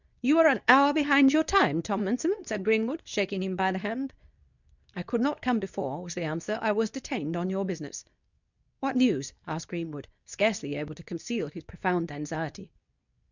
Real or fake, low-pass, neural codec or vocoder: fake; 7.2 kHz; codec, 24 kHz, 0.9 kbps, WavTokenizer, medium speech release version 2